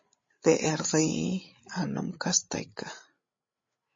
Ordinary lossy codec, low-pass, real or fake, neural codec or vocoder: MP3, 32 kbps; 7.2 kHz; fake; codec, 16 kHz, 8 kbps, FreqCodec, larger model